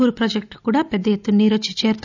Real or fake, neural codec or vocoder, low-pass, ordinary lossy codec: real; none; 7.2 kHz; none